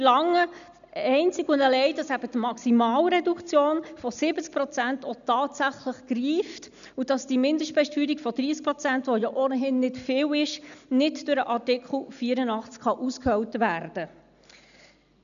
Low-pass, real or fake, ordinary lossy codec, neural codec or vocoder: 7.2 kHz; real; none; none